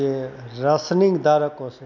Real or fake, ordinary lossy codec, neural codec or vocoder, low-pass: real; none; none; 7.2 kHz